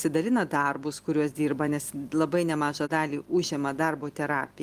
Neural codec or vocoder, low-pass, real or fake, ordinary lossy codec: none; 14.4 kHz; real; Opus, 32 kbps